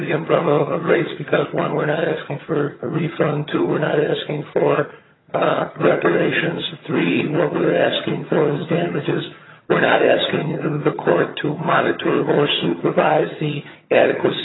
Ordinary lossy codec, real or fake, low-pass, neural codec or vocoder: AAC, 16 kbps; fake; 7.2 kHz; vocoder, 22.05 kHz, 80 mel bands, HiFi-GAN